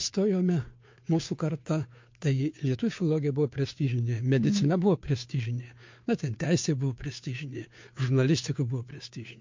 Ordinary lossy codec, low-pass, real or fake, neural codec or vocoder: MP3, 48 kbps; 7.2 kHz; fake; codec, 16 kHz, 2 kbps, FunCodec, trained on Chinese and English, 25 frames a second